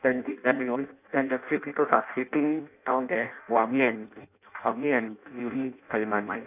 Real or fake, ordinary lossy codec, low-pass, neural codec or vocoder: fake; none; 3.6 kHz; codec, 16 kHz in and 24 kHz out, 0.6 kbps, FireRedTTS-2 codec